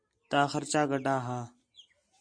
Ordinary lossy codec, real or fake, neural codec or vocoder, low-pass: MP3, 48 kbps; real; none; 9.9 kHz